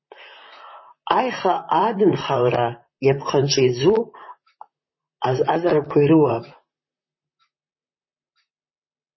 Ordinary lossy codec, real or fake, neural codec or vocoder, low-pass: MP3, 24 kbps; fake; vocoder, 44.1 kHz, 128 mel bands, Pupu-Vocoder; 7.2 kHz